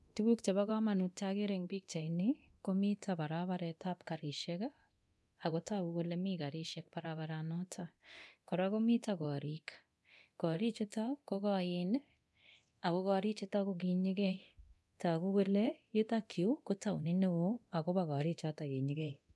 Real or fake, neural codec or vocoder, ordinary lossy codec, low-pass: fake; codec, 24 kHz, 0.9 kbps, DualCodec; none; none